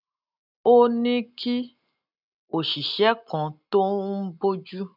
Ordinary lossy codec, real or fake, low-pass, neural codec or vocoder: none; real; 5.4 kHz; none